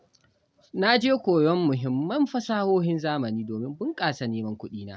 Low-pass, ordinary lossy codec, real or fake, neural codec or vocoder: none; none; real; none